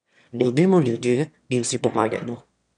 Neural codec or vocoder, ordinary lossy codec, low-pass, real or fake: autoencoder, 22.05 kHz, a latent of 192 numbers a frame, VITS, trained on one speaker; none; 9.9 kHz; fake